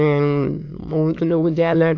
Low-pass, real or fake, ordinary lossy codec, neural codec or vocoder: 7.2 kHz; fake; AAC, 48 kbps; autoencoder, 22.05 kHz, a latent of 192 numbers a frame, VITS, trained on many speakers